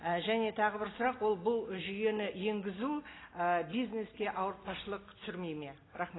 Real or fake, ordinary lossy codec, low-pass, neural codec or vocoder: real; AAC, 16 kbps; 7.2 kHz; none